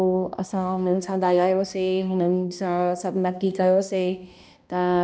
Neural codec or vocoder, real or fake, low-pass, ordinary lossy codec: codec, 16 kHz, 1 kbps, X-Codec, HuBERT features, trained on balanced general audio; fake; none; none